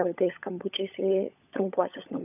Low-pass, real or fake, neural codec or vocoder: 3.6 kHz; fake; codec, 16 kHz, 16 kbps, FunCodec, trained on LibriTTS, 50 frames a second